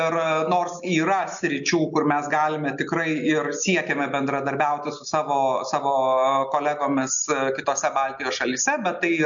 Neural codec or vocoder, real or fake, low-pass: none; real; 7.2 kHz